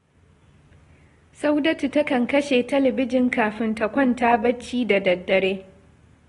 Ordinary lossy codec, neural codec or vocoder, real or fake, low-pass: AAC, 32 kbps; none; real; 10.8 kHz